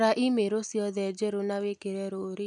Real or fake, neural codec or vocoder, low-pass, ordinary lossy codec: real; none; 10.8 kHz; none